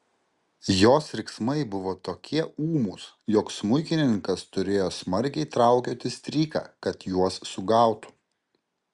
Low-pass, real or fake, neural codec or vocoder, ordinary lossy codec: 10.8 kHz; real; none; Opus, 64 kbps